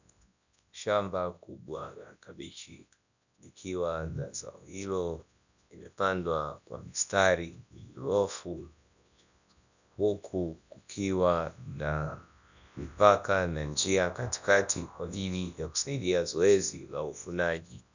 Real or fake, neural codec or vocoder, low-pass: fake; codec, 24 kHz, 0.9 kbps, WavTokenizer, large speech release; 7.2 kHz